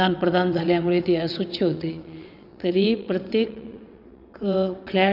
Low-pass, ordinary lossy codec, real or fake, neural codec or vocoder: 5.4 kHz; none; fake; vocoder, 22.05 kHz, 80 mel bands, WaveNeXt